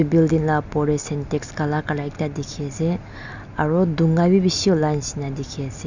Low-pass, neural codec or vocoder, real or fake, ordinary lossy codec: 7.2 kHz; none; real; none